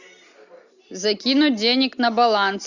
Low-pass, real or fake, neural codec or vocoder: 7.2 kHz; real; none